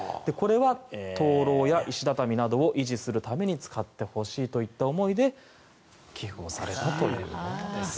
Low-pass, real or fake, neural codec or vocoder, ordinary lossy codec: none; real; none; none